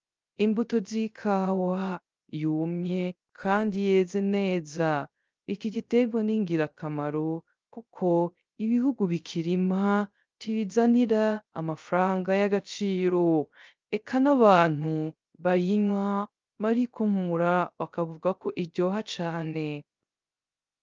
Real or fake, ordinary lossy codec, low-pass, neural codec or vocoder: fake; Opus, 24 kbps; 7.2 kHz; codec, 16 kHz, 0.3 kbps, FocalCodec